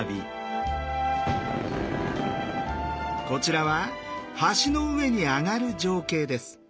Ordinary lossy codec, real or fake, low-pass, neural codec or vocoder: none; real; none; none